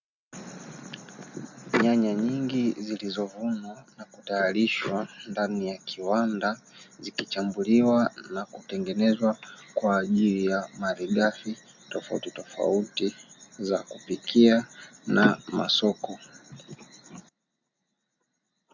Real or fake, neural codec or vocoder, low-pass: real; none; 7.2 kHz